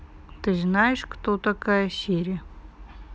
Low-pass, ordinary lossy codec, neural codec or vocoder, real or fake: none; none; none; real